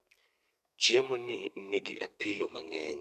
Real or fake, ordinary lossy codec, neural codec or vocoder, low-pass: fake; none; codec, 32 kHz, 1.9 kbps, SNAC; 14.4 kHz